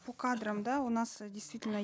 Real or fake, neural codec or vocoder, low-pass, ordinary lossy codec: real; none; none; none